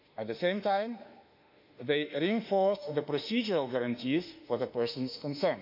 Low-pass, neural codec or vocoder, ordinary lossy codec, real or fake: 5.4 kHz; autoencoder, 48 kHz, 32 numbers a frame, DAC-VAE, trained on Japanese speech; MP3, 48 kbps; fake